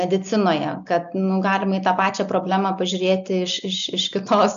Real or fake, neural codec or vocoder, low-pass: real; none; 7.2 kHz